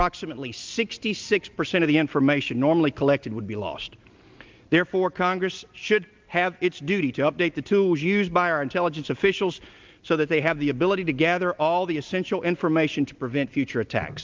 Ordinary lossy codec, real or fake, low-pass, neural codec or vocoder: Opus, 32 kbps; real; 7.2 kHz; none